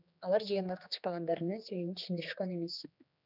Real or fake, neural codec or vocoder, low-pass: fake; codec, 16 kHz, 2 kbps, X-Codec, HuBERT features, trained on general audio; 5.4 kHz